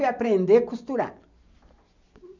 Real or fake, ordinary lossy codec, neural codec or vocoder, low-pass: real; none; none; 7.2 kHz